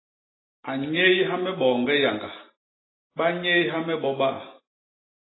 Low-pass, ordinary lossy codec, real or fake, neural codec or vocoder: 7.2 kHz; AAC, 16 kbps; real; none